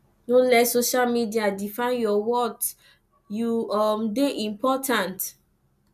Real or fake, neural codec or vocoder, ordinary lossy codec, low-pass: real; none; none; 14.4 kHz